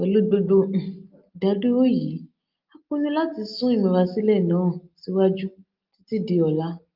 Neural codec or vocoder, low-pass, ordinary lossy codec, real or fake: none; 5.4 kHz; Opus, 24 kbps; real